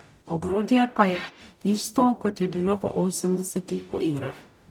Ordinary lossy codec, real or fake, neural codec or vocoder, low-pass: none; fake; codec, 44.1 kHz, 0.9 kbps, DAC; 19.8 kHz